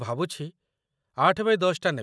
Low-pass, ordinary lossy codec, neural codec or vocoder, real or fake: none; none; none; real